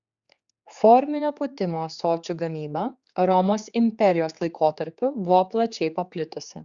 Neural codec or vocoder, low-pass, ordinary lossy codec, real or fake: codec, 16 kHz, 4 kbps, X-Codec, HuBERT features, trained on general audio; 7.2 kHz; Opus, 64 kbps; fake